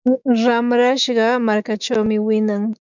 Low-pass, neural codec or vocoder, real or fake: 7.2 kHz; autoencoder, 48 kHz, 128 numbers a frame, DAC-VAE, trained on Japanese speech; fake